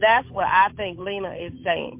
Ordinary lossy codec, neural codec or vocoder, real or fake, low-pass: MP3, 32 kbps; codec, 16 kHz, 8 kbps, FunCodec, trained on Chinese and English, 25 frames a second; fake; 3.6 kHz